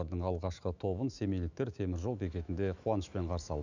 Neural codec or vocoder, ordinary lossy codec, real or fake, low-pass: autoencoder, 48 kHz, 128 numbers a frame, DAC-VAE, trained on Japanese speech; none; fake; 7.2 kHz